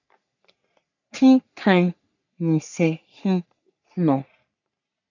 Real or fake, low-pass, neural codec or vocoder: fake; 7.2 kHz; codec, 44.1 kHz, 3.4 kbps, Pupu-Codec